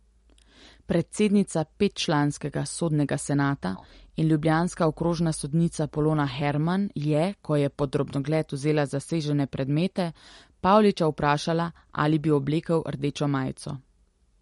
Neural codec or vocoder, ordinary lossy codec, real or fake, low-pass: none; MP3, 48 kbps; real; 19.8 kHz